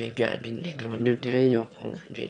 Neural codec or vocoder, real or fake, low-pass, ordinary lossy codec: autoencoder, 22.05 kHz, a latent of 192 numbers a frame, VITS, trained on one speaker; fake; 9.9 kHz; MP3, 96 kbps